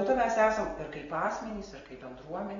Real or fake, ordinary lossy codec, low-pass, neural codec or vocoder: real; AAC, 24 kbps; 7.2 kHz; none